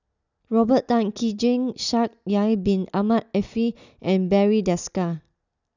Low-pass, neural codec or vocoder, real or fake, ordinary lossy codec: 7.2 kHz; none; real; none